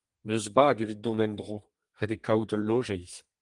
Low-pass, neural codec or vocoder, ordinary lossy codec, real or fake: 10.8 kHz; codec, 32 kHz, 1.9 kbps, SNAC; Opus, 24 kbps; fake